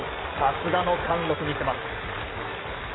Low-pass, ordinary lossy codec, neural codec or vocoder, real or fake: 7.2 kHz; AAC, 16 kbps; none; real